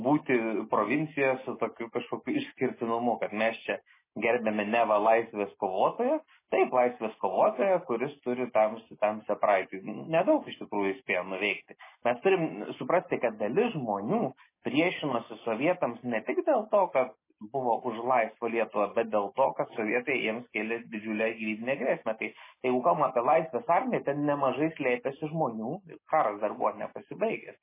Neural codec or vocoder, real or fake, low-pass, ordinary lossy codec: none; real; 3.6 kHz; MP3, 16 kbps